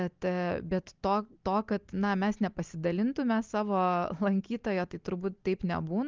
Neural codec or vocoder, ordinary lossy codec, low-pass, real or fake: none; Opus, 24 kbps; 7.2 kHz; real